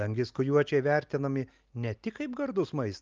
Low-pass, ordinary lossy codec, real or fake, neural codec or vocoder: 7.2 kHz; Opus, 24 kbps; real; none